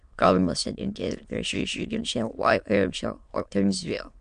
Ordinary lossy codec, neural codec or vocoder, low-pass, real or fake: MP3, 64 kbps; autoencoder, 22.05 kHz, a latent of 192 numbers a frame, VITS, trained on many speakers; 9.9 kHz; fake